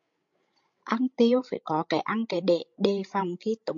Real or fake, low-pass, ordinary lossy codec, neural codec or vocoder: fake; 7.2 kHz; MP3, 48 kbps; codec, 16 kHz, 16 kbps, FreqCodec, larger model